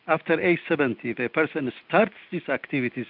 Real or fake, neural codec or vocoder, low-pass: real; none; 5.4 kHz